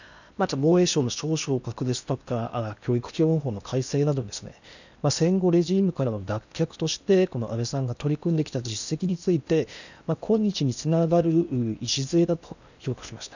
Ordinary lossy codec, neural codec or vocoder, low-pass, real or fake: none; codec, 16 kHz in and 24 kHz out, 0.8 kbps, FocalCodec, streaming, 65536 codes; 7.2 kHz; fake